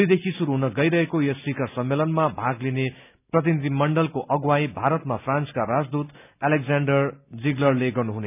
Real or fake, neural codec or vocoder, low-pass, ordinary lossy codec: real; none; 3.6 kHz; none